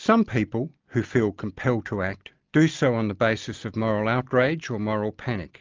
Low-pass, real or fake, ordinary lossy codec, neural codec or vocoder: 7.2 kHz; real; Opus, 32 kbps; none